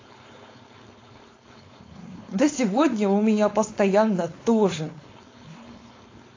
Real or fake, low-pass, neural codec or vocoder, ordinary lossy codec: fake; 7.2 kHz; codec, 16 kHz, 4.8 kbps, FACodec; AAC, 32 kbps